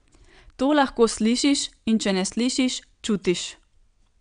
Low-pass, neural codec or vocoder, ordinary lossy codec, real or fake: 9.9 kHz; vocoder, 22.05 kHz, 80 mel bands, WaveNeXt; none; fake